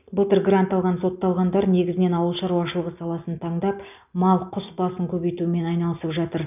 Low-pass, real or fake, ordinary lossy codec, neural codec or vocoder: 3.6 kHz; real; none; none